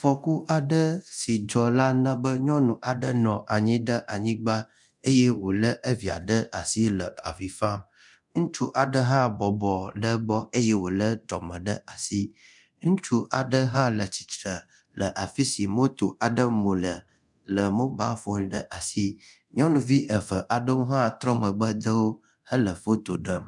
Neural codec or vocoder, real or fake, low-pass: codec, 24 kHz, 0.9 kbps, DualCodec; fake; 10.8 kHz